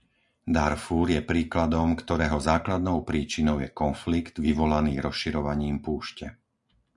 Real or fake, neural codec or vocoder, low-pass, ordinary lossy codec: real; none; 10.8 kHz; MP3, 96 kbps